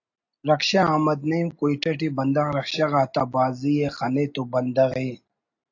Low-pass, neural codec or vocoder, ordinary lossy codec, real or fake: 7.2 kHz; none; AAC, 48 kbps; real